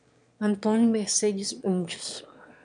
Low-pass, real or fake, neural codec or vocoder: 9.9 kHz; fake; autoencoder, 22.05 kHz, a latent of 192 numbers a frame, VITS, trained on one speaker